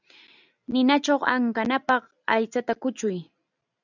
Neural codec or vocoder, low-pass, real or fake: none; 7.2 kHz; real